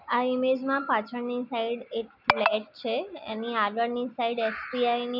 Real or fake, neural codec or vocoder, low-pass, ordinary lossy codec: real; none; 5.4 kHz; none